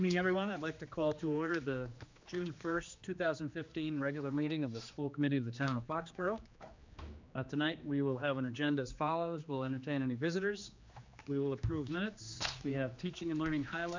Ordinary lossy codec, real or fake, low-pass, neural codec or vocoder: MP3, 64 kbps; fake; 7.2 kHz; codec, 16 kHz, 2 kbps, X-Codec, HuBERT features, trained on general audio